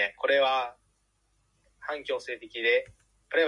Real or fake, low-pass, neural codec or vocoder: real; 10.8 kHz; none